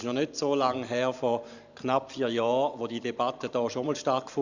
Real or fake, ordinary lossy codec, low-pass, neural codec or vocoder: fake; Opus, 64 kbps; 7.2 kHz; vocoder, 44.1 kHz, 128 mel bands every 512 samples, BigVGAN v2